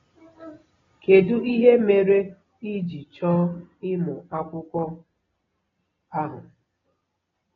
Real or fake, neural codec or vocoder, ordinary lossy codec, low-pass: real; none; AAC, 24 kbps; 7.2 kHz